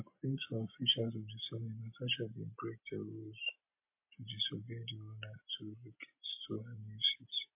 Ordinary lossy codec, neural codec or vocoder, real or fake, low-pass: MP3, 24 kbps; none; real; 3.6 kHz